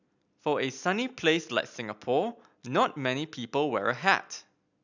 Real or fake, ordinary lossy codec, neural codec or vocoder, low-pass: real; none; none; 7.2 kHz